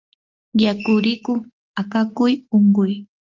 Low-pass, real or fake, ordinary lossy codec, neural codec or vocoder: 7.2 kHz; real; Opus, 32 kbps; none